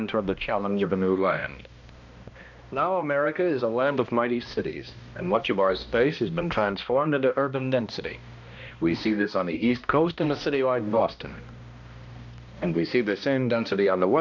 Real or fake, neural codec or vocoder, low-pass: fake; codec, 16 kHz, 1 kbps, X-Codec, HuBERT features, trained on balanced general audio; 7.2 kHz